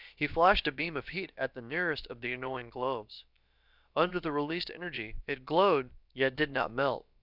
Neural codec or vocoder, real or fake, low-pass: codec, 16 kHz, about 1 kbps, DyCAST, with the encoder's durations; fake; 5.4 kHz